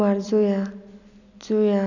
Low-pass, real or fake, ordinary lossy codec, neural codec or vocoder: 7.2 kHz; real; none; none